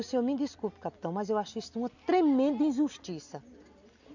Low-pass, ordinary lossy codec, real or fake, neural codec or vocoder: 7.2 kHz; none; real; none